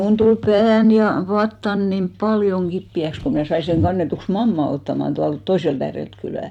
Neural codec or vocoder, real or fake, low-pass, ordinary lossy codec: vocoder, 44.1 kHz, 128 mel bands every 256 samples, BigVGAN v2; fake; 19.8 kHz; none